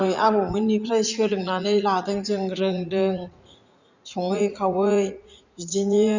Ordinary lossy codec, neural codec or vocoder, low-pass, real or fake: Opus, 64 kbps; vocoder, 44.1 kHz, 80 mel bands, Vocos; 7.2 kHz; fake